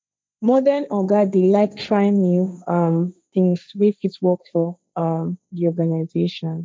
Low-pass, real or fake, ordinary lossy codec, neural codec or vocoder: none; fake; none; codec, 16 kHz, 1.1 kbps, Voila-Tokenizer